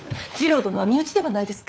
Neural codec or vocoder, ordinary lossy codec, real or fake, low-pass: codec, 16 kHz, 4 kbps, FunCodec, trained on LibriTTS, 50 frames a second; none; fake; none